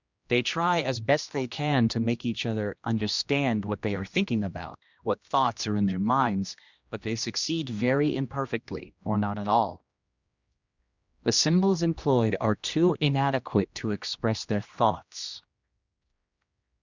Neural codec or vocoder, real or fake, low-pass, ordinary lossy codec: codec, 16 kHz, 1 kbps, X-Codec, HuBERT features, trained on general audio; fake; 7.2 kHz; Opus, 64 kbps